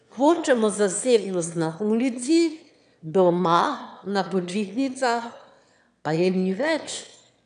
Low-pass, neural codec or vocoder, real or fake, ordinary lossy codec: 9.9 kHz; autoencoder, 22.05 kHz, a latent of 192 numbers a frame, VITS, trained on one speaker; fake; none